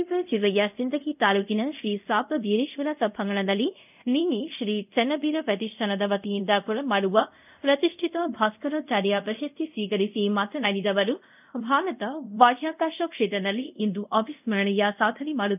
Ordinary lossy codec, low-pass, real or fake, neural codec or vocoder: none; 3.6 kHz; fake; codec, 24 kHz, 0.5 kbps, DualCodec